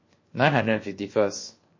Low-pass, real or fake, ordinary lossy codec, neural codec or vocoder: 7.2 kHz; fake; MP3, 32 kbps; codec, 16 kHz, 0.7 kbps, FocalCodec